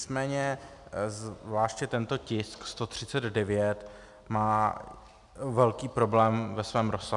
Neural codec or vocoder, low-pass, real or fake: none; 10.8 kHz; real